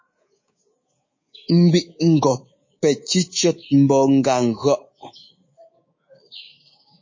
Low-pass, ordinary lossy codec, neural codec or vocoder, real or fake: 7.2 kHz; MP3, 32 kbps; codec, 24 kHz, 3.1 kbps, DualCodec; fake